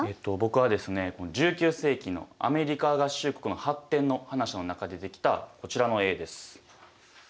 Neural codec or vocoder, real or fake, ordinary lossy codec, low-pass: none; real; none; none